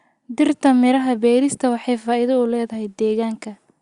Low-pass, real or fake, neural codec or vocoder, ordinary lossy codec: 10.8 kHz; real; none; Opus, 64 kbps